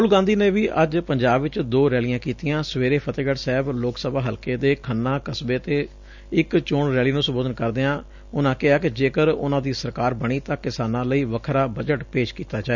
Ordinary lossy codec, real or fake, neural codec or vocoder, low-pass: none; real; none; 7.2 kHz